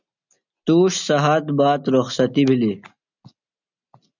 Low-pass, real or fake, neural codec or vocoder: 7.2 kHz; real; none